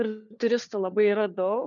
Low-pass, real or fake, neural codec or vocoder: 7.2 kHz; fake; codec, 16 kHz, 4 kbps, FunCodec, trained on LibriTTS, 50 frames a second